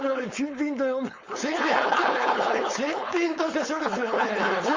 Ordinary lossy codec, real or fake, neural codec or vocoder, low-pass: Opus, 32 kbps; fake; codec, 16 kHz, 4.8 kbps, FACodec; 7.2 kHz